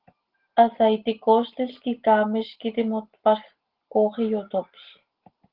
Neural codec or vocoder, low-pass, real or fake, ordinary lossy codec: none; 5.4 kHz; real; Opus, 16 kbps